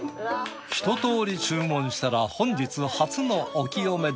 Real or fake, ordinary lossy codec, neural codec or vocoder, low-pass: real; none; none; none